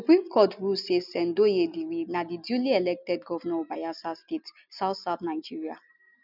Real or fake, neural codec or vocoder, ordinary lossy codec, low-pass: real; none; none; 5.4 kHz